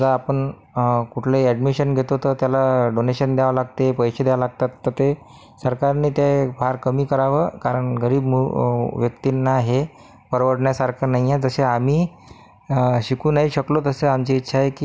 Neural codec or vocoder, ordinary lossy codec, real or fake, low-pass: none; none; real; none